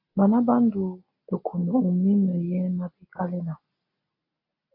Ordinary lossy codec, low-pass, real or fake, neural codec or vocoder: AAC, 32 kbps; 5.4 kHz; real; none